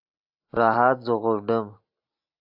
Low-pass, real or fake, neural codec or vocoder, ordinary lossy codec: 5.4 kHz; real; none; AAC, 48 kbps